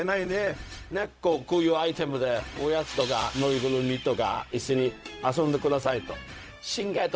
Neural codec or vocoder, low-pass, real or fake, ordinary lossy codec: codec, 16 kHz, 0.4 kbps, LongCat-Audio-Codec; none; fake; none